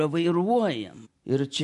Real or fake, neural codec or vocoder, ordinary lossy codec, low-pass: real; none; MP3, 64 kbps; 10.8 kHz